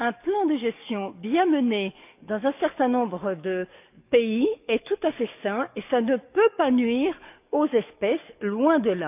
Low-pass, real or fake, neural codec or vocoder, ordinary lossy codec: 3.6 kHz; fake; codec, 44.1 kHz, 7.8 kbps, DAC; none